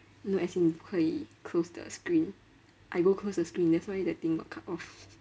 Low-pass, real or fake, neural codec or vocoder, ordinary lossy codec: none; real; none; none